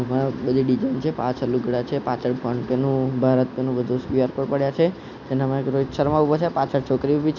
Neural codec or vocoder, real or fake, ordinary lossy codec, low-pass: none; real; none; 7.2 kHz